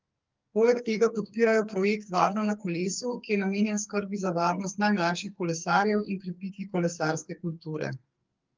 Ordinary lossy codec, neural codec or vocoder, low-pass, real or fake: Opus, 24 kbps; codec, 44.1 kHz, 2.6 kbps, SNAC; 7.2 kHz; fake